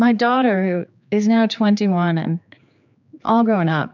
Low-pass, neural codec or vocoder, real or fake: 7.2 kHz; codec, 16 kHz, 4 kbps, X-Codec, HuBERT features, trained on general audio; fake